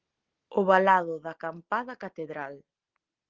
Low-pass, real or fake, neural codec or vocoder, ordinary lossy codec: 7.2 kHz; real; none; Opus, 16 kbps